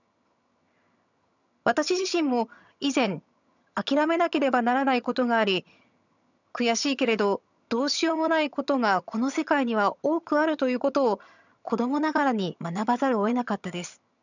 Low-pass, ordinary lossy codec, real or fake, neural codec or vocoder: 7.2 kHz; none; fake; vocoder, 22.05 kHz, 80 mel bands, HiFi-GAN